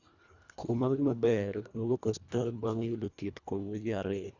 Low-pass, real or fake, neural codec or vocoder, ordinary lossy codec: 7.2 kHz; fake; codec, 24 kHz, 1.5 kbps, HILCodec; Opus, 64 kbps